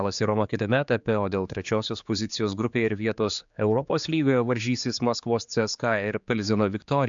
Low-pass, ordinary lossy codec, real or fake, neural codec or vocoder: 7.2 kHz; MP3, 64 kbps; fake; codec, 16 kHz, 4 kbps, X-Codec, HuBERT features, trained on general audio